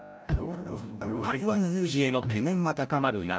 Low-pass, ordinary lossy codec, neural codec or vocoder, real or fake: none; none; codec, 16 kHz, 0.5 kbps, FreqCodec, larger model; fake